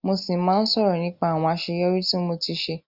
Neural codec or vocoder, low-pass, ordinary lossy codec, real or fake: none; 5.4 kHz; Opus, 64 kbps; real